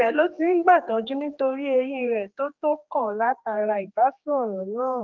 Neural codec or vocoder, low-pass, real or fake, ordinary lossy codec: codec, 16 kHz, 2 kbps, X-Codec, HuBERT features, trained on general audio; 7.2 kHz; fake; Opus, 32 kbps